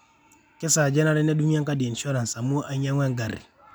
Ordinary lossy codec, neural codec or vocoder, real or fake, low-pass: none; none; real; none